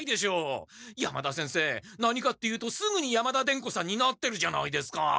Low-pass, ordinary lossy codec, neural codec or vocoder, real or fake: none; none; none; real